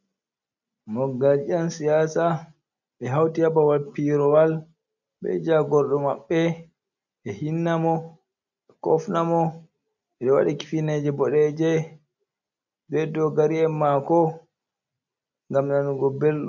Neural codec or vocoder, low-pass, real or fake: none; 7.2 kHz; real